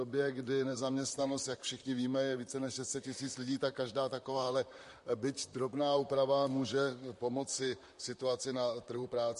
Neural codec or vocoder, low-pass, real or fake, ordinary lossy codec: vocoder, 44.1 kHz, 128 mel bands, Pupu-Vocoder; 14.4 kHz; fake; MP3, 48 kbps